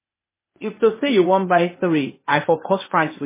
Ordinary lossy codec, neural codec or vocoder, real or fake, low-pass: MP3, 16 kbps; codec, 16 kHz, 0.8 kbps, ZipCodec; fake; 3.6 kHz